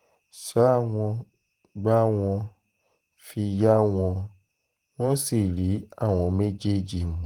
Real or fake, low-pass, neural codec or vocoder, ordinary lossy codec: fake; 19.8 kHz; vocoder, 48 kHz, 128 mel bands, Vocos; Opus, 24 kbps